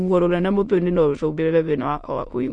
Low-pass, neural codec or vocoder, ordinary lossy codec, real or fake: 9.9 kHz; autoencoder, 22.05 kHz, a latent of 192 numbers a frame, VITS, trained on many speakers; MP3, 48 kbps; fake